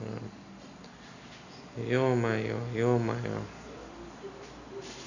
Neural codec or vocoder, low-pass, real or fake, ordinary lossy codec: none; 7.2 kHz; real; none